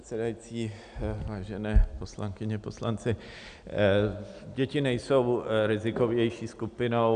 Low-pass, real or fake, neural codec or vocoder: 9.9 kHz; real; none